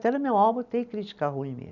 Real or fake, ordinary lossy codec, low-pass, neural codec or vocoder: real; none; 7.2 kHz; none